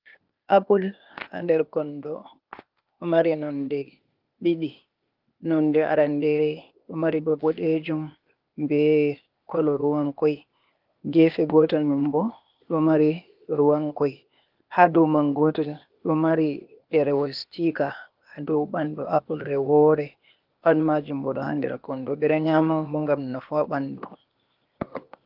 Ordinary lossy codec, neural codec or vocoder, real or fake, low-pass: Opus, 32 kbps; codec, 16 kHz, 0.8 kbps, ZipCodec; fake; 5.4 kHz